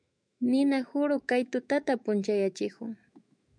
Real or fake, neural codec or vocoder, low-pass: fake; autoencoder, 48 kHz, 128 numbers a frame, DAC-VAE, trained on Japanese speech; 9.9 kHz